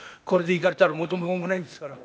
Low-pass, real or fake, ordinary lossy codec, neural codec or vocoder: none; fake; none; codec, 16 kHz, 0.8 kbps, ZipCodec